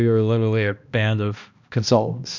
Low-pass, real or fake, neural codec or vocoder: 7.2 kHz; fake; codec, 16 kHz, 1 kbps, X-Codec, HuBERT features, trained on balanced general audio